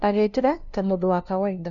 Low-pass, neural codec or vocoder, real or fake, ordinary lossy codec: 7.2 kHz; codec, 16 kHz, 0.5 kbps, FunCodec, trained on LibriTTS, 25 frames a second; fake; none